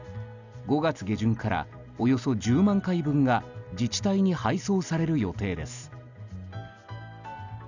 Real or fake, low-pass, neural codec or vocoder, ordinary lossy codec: real; 7.2 kHz; none; none